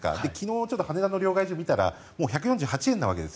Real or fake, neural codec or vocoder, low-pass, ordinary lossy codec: real; none; none; none